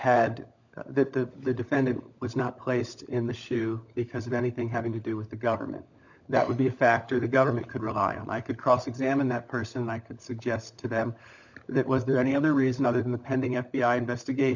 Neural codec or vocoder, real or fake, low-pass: codec, 16 kHz, 16 kbps, FunCodec, trained on LibriTTS, 50 frames a second; fake; 7.2 kHz